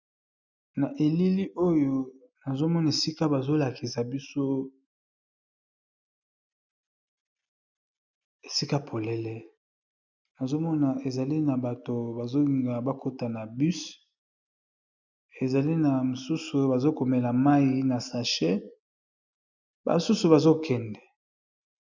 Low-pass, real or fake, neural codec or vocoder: 7.2 kHz; real; none